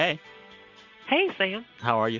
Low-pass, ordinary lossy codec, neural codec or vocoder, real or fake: 7.2 kHz; MP3, 64 kbps; none; real